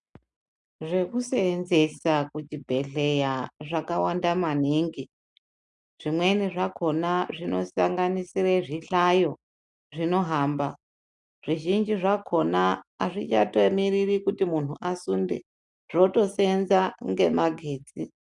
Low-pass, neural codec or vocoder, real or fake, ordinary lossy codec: 10.8 kHz; none; real; MP3, 96 kbps